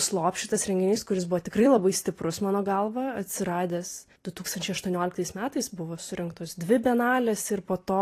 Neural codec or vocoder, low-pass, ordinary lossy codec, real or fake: vocoder, 44.1 kHz, 128 mel bands every 256 samples, BigVGAN v2; 14.4 kHz; AAC, 48 kbps; fake